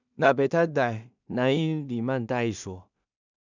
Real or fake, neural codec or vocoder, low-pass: fake; codec, 16 kHz in and 24 kHz out, 0.4 kbps, LongCat-Audio-Codec, two codebook decoder; 7.2 kHz